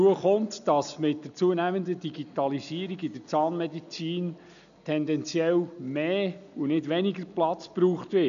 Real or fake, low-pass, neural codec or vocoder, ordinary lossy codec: real; 7.2 kHz; none; none